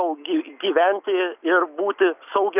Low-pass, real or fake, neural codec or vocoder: 3.6 kHz; real; none